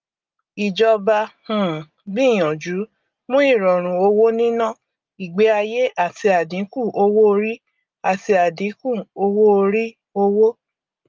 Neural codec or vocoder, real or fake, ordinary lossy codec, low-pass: none; real; Opus, 24 kbps; 7.2 kHz